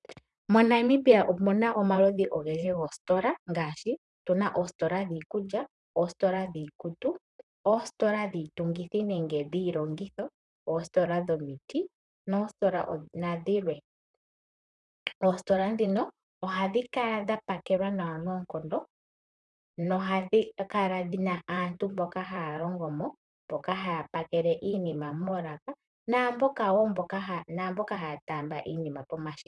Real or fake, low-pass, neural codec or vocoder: fake; 10.8 kHz; vocoder, 44.1 kHz, 128 mel bands, Pupu-Vocoder